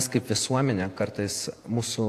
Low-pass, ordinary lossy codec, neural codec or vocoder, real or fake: 14.4 kHz; AAC, 64 kbps; vocoder, 48 kHz, 128 mel bands, Vocos; fake